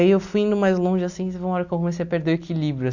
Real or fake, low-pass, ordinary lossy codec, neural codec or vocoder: real; 7.2 kHz; none; none